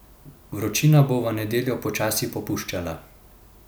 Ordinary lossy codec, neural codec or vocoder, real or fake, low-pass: none; none; real; none